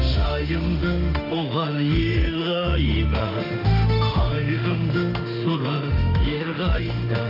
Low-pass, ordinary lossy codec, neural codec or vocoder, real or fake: 5.4 kHz; none; autoencoder, 48 kHz, 32 numbers a frame, DAC-VAE, trained on Japanese speech; fake